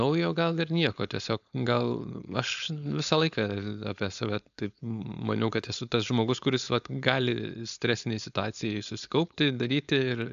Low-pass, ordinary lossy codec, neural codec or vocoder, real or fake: 7.2 kHz; AAC, 96 kbps; codec, 16 kHz, 4.8 kbps, FACodec; fake